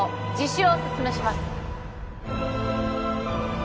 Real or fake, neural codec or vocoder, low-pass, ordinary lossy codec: real; none; none; none